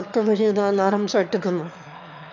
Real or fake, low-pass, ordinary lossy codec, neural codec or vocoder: fake; 7.2 kHz; none; autoencoder, 22.05 kHz, a latent of 192 numbers a frame, VITS, trained on one speaker